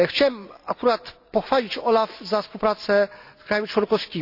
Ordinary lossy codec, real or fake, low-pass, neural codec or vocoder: none; real; 5.4 kHz; none